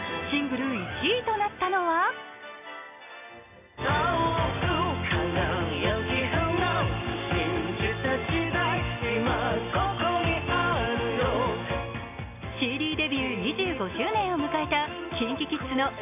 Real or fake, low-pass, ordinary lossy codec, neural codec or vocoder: real; 3.6 kHz; none; none